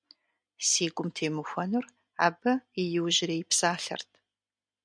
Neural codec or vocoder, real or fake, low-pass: none; real; 9.9 kHz